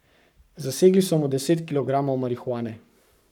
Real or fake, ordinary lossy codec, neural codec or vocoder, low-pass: fake; none; codec, 44.1 kHz, 7.8 kbps, Pupu-Codec; 19.8 kHz